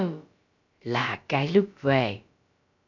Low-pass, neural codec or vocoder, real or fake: 7.2 kHz; codec, 16 kHz, about 1 kbps, DyCAST, with the encoder's durations; fake